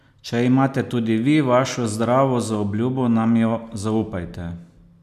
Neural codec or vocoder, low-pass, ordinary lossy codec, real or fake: none; 14.4 kHz; none; real